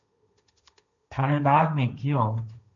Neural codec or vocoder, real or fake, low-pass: codec, 16 kHz, 1.1 kbps, Voila-Tokenizer; fake; 7.2 kHz